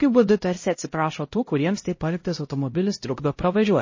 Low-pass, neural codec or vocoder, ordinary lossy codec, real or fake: 7.2 kHz; codec, 16 kHz, 0.5 kbps, X-Codec, HuBERT features, trained on LibriSpeech; MP3, 32 kbps; fake